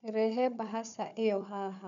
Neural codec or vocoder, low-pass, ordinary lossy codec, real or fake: codec, 16 kHz, 4 kbps, FunCodec, trained on Chinese and English, 50 frames a second; 7.2 kHz; none; fake